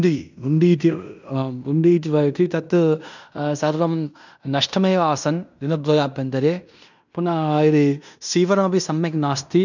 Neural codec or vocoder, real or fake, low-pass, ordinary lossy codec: codec, 16 kHz in and 24 kHz out, 0.9 kbps, LongCat-Audio-Codec, fine tuned four codebook decoder; fake; 7.2 kHz; none